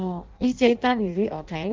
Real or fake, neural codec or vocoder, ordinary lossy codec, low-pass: fake; codec, 16 kHz in and 24 kHz out, 0.6 kbps, FireRedTTS-2 codec; Opus, 32 kbps; 7.2 kHz